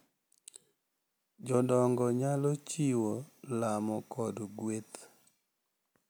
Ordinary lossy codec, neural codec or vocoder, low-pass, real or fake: none; none; none; real